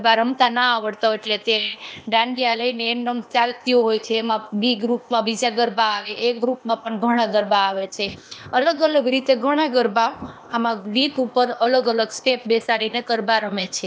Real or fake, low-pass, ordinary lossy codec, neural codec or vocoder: fake; none; none; codec, 16 kHz, 0.8 kbps, ZipCodec